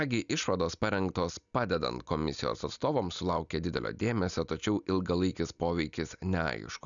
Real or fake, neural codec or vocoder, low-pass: real; none; 7.2 kHz